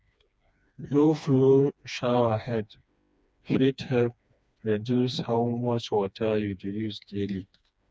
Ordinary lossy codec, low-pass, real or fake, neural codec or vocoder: none; none; fake; codec, 16 kHz, 2 kbps, FreqCodec, smaller model